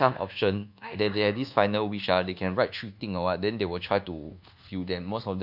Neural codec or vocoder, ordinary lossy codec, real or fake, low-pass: codec, 24 kHz, 1.2 kbps, DualCodec; none; fake; 5.4 kHz